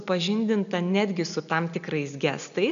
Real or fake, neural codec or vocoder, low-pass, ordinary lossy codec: real; none; 7.2 kHz; AAC, 96 kbps